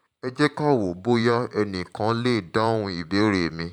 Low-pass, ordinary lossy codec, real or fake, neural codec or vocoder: 19.8 kHz; none; fake; vocoder, 44.1 kHz, 128 mel bands every 512 samples, BigVGAN v2